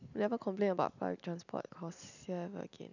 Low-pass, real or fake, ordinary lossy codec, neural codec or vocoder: 7.2 kHz; real; none; none